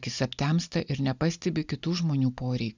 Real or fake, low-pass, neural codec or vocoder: real; 7.2 kHz; none